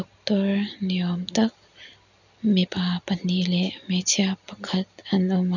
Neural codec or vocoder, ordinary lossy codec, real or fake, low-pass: none; none; real; 7.2 kHz